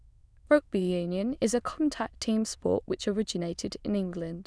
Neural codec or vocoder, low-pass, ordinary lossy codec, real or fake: autoencoder, 22.05 kHz, a latent of 192 numbers a frame, VITS, trained on many speakers; none; none; fake